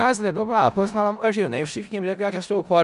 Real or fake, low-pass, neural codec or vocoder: fake; 10.8 kHz; codec, 16 kHz in and 24 kHz out, 0.4 kbps, LongCat-Audio-Codec, four codebook decoder